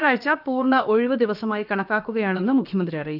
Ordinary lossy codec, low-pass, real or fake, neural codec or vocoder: none; 5.4 kHz; fake; codec, 16 kHz, about 1 kbps, DyCAST, with the encoder's durations